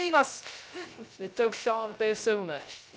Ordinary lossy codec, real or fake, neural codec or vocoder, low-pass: none; fake; codec, 16 kHz, 0.3 kbps, FocalCodec; none